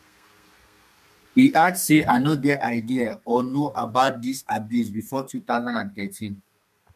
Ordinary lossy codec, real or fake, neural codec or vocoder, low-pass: MP3, 96 kbps; fake; codec, 32 kHz, 1.9 kbps, SNAC; 14.4 kHz